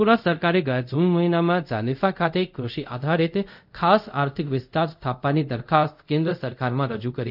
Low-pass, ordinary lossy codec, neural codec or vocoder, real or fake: 5.4 kHz; none; codec, 24 kHz, 0.5 kbps, DualCodec; fake